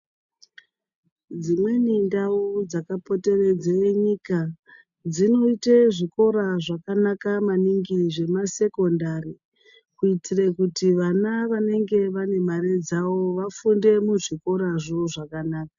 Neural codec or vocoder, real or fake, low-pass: none; real; 7.2 kHz